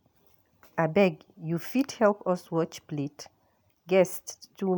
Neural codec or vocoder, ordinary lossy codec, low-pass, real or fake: none; none; none; real